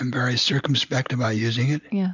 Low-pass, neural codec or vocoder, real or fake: 7.2 kHz; none; real